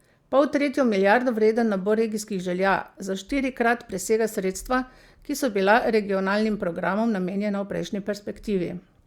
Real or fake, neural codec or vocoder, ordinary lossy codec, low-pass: real; none; Opus, 64 kbps; 19.8 kHz